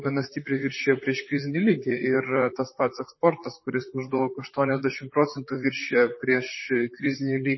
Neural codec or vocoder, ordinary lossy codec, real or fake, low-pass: vocoder, 44.1 kHz, 128 mel bands, Pupu-Vocoder; MP3, 24 kbps; fake; 7.2 kHz